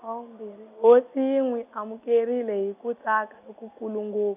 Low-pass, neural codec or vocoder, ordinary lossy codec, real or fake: 3.6 kHz; none; none; real